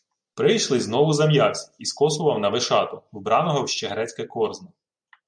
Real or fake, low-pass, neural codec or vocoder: real; 9.9 kHz; none